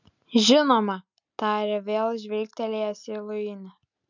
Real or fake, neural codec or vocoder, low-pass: real; none; 7.2 kHz